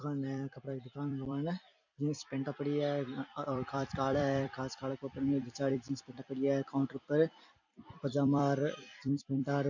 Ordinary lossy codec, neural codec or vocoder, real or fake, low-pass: none; vocoder, 44.1 kHz, 128 mel bands every 256 samples, BigVGAN v2; fake; 7.2 kHz